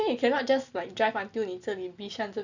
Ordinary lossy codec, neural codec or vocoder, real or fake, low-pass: none; none; real; 7.2 kHz